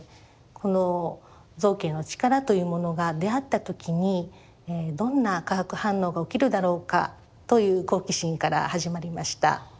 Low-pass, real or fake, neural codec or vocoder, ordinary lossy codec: none; real; none; none